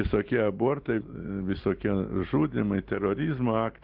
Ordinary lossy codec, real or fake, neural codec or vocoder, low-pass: Opus, 16 kbps; real; none; 5.4 kHz